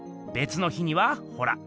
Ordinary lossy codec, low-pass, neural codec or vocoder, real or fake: none; none; none; real